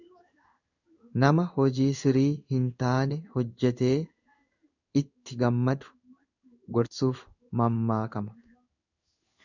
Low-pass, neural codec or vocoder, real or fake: 7.2 kHz; codec, 16 kHz in and 24 kHz out, 1 kbps, XY-Tokenizer; fake